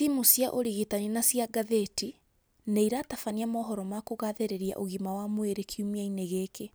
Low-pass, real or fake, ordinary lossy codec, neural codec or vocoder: none; real; none; none